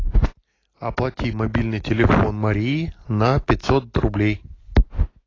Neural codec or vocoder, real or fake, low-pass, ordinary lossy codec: none; real; 7.2 kHz; AAC, 32 kbps